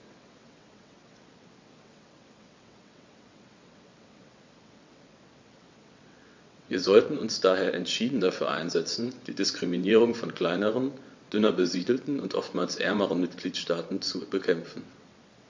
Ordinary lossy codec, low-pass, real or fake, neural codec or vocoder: MP3, 48 kbps; 7.2 kHz; fake; vocoder, 22.05 kHz, 80 mel bands, WaveNeXt